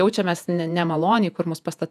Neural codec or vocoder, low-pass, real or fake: vocoder, 48 kHz, 128 mel bands, Vocos; 14.4 kHz; fake